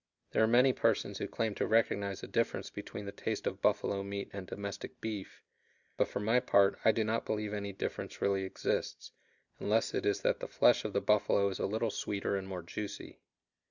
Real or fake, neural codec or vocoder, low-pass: real; none; 7.2 kHz